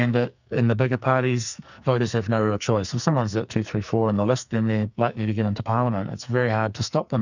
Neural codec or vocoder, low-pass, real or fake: codec, 44.1 kHz, 2.6 kbps, SNAC; 7.2 kHz; fake